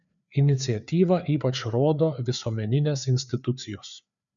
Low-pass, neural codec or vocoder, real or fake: 7.2 kHz; codec, 16 kHz, 4 kbps, FreqCodec, larger model; fake